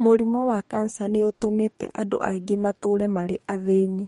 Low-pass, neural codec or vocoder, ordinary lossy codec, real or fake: 19.8 kHz; codec, 44.1 kHz, 2.6 kbps, DAC; MP3, 48 kbps; fake